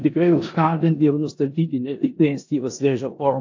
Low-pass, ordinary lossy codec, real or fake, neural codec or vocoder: 7.2 kHz; AAC, 48 kbps; fake; codec, 16 kHz in and 24 kHz out, 0.9 kbps, LongCat-Audio-Codec, four codebook decoder